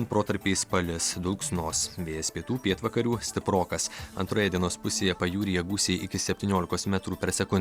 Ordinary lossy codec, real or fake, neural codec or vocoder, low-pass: Opus, 64 kbps; real; none; 19.8 kHz